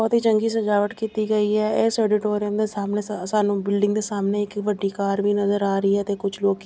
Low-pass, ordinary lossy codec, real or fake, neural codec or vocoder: none; none; real; none